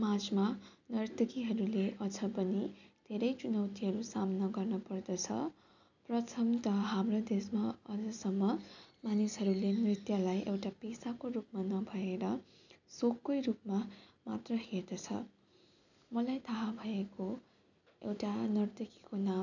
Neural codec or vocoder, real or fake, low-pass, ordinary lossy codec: none; real; 7.2 kHz; none